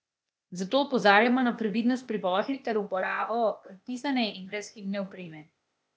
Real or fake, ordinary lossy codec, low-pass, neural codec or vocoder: fake; none; none; codec, 16 kHz, 0.8 kbps, ZipCodec